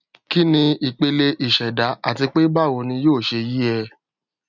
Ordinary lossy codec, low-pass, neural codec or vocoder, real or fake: none; 7.2 kHz; none; real